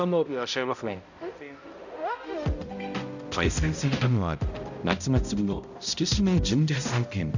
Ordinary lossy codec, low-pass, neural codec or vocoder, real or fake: none; 7.2 kHz; codec, 16 kHz, 0.5 kbps, X-Codec, HuBERT features, trained on balanced general audio; fake